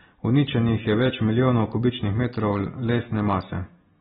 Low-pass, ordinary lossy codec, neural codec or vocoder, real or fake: 19.8 kHz; AAC, 16 kbps; none; real